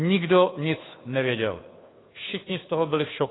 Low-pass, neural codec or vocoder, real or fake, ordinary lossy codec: 7.2 kHz; codec, 16 kHz, 2 kbps, FunCodec, trained on Chinese and English, 25 frames a second; fake; AAC, 16 kbps